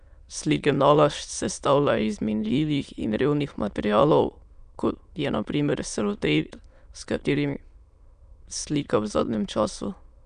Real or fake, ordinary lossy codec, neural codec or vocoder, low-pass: fake; none; autoencoder, 22.05 kHz, a latent of 192 numbers a frame, VITS, trained on many speakers; 9.9 kHz